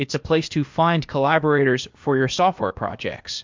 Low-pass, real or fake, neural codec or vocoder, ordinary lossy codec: 7.2 kHz; fake; codec, 16 kHz, 0.8 kbps, ZipCodec; MP3, 64 kbps